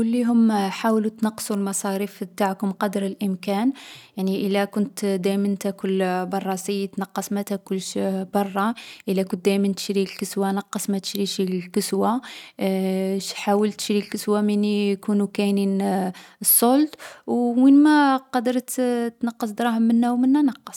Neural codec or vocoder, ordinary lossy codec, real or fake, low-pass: none; none; real; 19.8 kHz